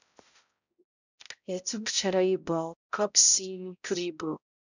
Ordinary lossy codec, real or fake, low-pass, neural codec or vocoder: none; fake; 7.2 kHz; codec, 16 kHz, 0.5 kbps, X-Codec, HuBERT features, trained on balanced general audio